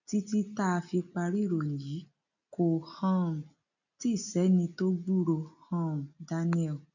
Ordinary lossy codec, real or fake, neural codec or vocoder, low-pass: none; real; none; 7.2 kHz